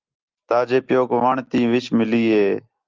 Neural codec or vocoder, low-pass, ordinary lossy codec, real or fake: none; 7.2 kHz; Opus, 32 kbps; real